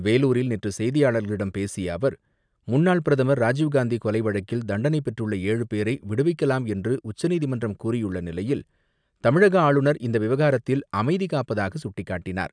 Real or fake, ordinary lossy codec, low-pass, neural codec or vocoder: real; none; 9.9 kHz; none